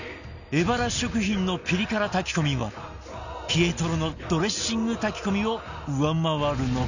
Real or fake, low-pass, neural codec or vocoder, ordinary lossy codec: real; 7.2 kHz; none; none